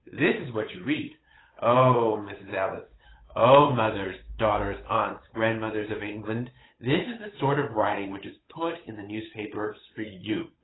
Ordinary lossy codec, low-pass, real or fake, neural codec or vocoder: AAC, 16 kbps; 7.2 kHz; fake; codec, 16 kHz, 8 kbps, FunCodec, trained on Chinese and English, 25 frames a second